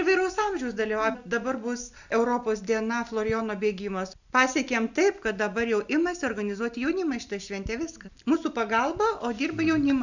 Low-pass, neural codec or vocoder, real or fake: 7.2 kHz; none; real